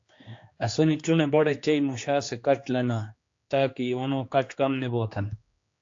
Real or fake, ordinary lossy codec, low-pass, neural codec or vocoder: fake; AAC, 48 kbps; 7.2 kHz; codec, 16 kHz, 2 kbps, X-Codec, HuBERT features, trained on general audio